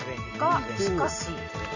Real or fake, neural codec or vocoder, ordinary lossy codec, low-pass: real; none; none; 7.2 kHz